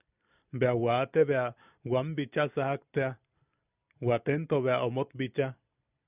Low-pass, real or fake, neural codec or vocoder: 3.6 kHz; fake; vocoder, 44.1 kHz, 128 mel bands every 256 samples, BigVGAN v2